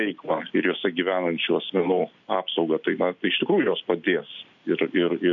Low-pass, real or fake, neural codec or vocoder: 7.2 kHz; real; none